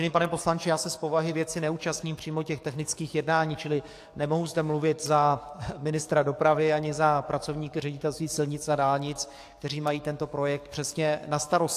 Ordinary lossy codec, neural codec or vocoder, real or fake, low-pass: AAC, 64 kbps; codec, 44.1 kHz, 7.8 kbps, DAC; fake; 14.4 kHz